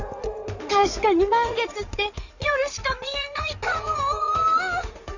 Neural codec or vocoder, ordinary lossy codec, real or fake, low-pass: codec, 16 kHz in and 24 kHz out, 2.2 kbps, FireRedTTS-2 codec; none; fake; 7.2 kHz